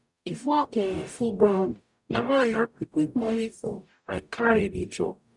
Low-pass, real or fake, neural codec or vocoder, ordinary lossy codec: 10.8 kHz; fake; codec, 44.1 kHz, 0.9 kbps, DAC; none